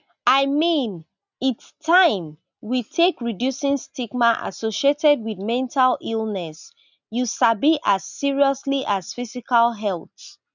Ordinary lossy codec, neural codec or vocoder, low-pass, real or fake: none; none; 7.2 kHz; real